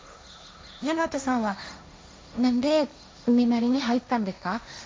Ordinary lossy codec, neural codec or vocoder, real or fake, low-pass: none; codec, 16 kHz, 1.1 kbps, Voila-Tokenizer; fake; none